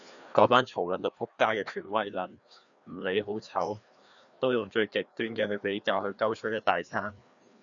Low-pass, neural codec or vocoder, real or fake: 7.2 kHz; codec, 16 kHz, 2 kbps, FreqCodec, larger model; fake